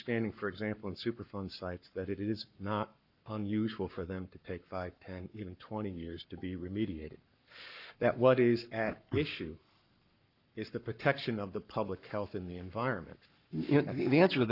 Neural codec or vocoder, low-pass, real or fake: codec, 44.1 kHz, 7.8 kbps, Pupu-Codec; 5.4 kHz; fake